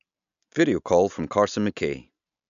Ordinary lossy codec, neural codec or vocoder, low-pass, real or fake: none; none; 7.2 kHz; real